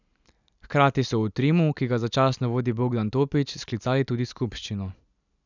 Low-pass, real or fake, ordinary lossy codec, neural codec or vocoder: 7.2 kHz; real; none; none